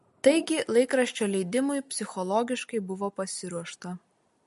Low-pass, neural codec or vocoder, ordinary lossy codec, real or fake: 14.4 kHz; none; MP3, 48 kbps; real